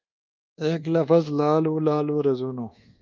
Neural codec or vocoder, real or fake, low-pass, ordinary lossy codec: codec, 24 kHz, 1.2 kbps, DualCodec; fake; 7.2 kHz; Opus, 24 kbps